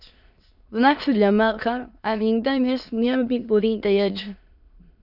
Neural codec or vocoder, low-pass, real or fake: autoencoder, 22.05 kHz, a latent of 192 numbers a frame, VITS, trained on many speakers; 5.4 kHz; fake